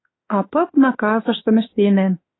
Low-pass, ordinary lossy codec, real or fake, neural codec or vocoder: 7.2 kHz; AAC, 16 kbps; fake; autoencoder, 48 kHz, 32 numbers a frame, DAC-VAE, trained on Japanese speech